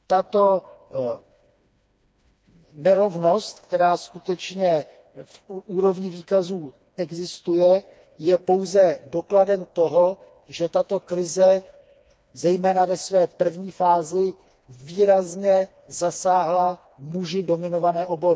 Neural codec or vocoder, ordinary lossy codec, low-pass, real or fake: codec, 16 kHz, 2 kbps, FreqCodec, smaller model; none; none; fake